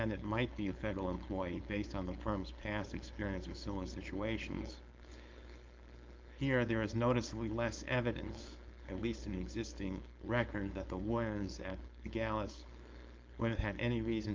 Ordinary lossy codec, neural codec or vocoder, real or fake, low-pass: Opus, 24 kbps; codec, 16 kHz, 4.8 kbps, FACodec; fake; 7.2 kHz